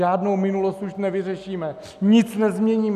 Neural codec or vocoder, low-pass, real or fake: none; 14.4 kHz; real